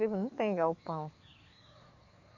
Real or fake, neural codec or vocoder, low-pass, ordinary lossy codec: fake; codec, 44.1 kHz, 7.8 kbps, DAC; 7.2 kHz; none